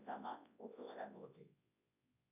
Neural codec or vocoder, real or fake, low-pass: codec, 24 kHz, 0.9 kbps, WavTokenizer, large speech release; fake; 3.6 kHz